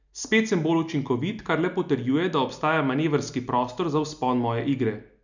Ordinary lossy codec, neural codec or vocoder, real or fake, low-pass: none; none; real; 7.2 kHz